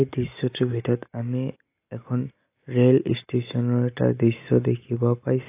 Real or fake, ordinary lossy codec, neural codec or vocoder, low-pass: real; AAC, 24 kbps; none; 3.6 kHz